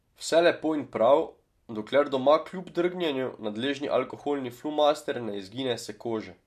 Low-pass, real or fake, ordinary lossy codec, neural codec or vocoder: 14.4 kHz; real; MP3, 64 kbps; none